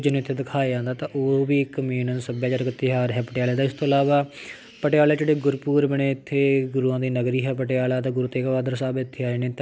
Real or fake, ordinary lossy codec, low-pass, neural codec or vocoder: real; none; none; none